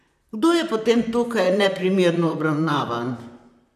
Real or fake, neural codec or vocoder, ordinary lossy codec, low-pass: fake; vocoder, 44.1 kHz, 128 mel bands, Pupu-Vocoder; none; 14.4 kHz